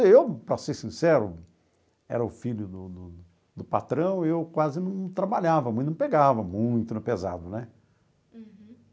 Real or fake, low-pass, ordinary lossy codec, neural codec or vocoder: real; none; none; none